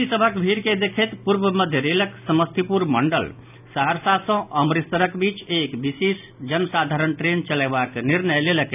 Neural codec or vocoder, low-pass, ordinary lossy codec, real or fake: none; 3.6 kHz; none; real